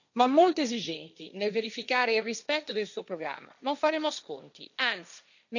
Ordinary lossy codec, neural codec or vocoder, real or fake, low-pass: none; codec, 16 kHz, 1.1 kbps, Voila-Tokenizer; fake; 7.2 kHz